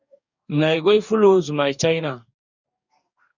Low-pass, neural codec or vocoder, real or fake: 7.2 kHz; codec, 44.1 kHz, 2.6 kbps, DAC; fake